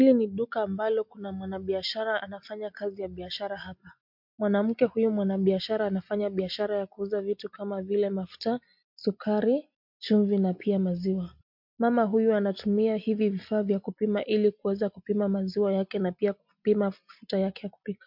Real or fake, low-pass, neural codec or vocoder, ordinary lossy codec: real; 5.4 kHz; none; MP3, 48 kbps